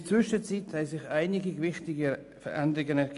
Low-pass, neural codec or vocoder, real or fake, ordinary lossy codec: 10.8 kHz; none; real; AAC, 96 kbps